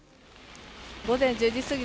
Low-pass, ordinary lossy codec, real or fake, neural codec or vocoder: none; none; real; none